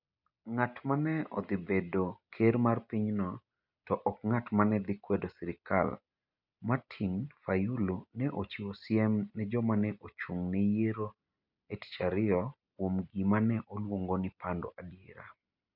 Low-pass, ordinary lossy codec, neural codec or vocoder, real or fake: 5.4 kHz; none; none; real